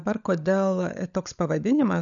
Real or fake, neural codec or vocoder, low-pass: fake; codec, 16 kHz, 16 kbps, FunCodec, trained on LibriTTS, 50 frames a second; 7.2 kHz